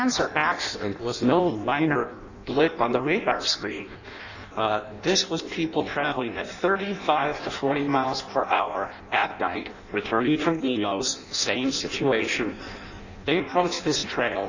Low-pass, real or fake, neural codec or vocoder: 7.2 kHz; fake; codec, 16 kHz in and 24 kHz out, 0.6 kbps, FireRedTTS-2 codec